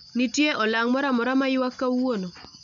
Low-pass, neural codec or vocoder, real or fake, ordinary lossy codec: 7.2 kHz; none; real; none